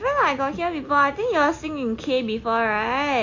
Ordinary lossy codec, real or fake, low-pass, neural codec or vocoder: AAC, 48 kbps; real; 7.2 kHz; none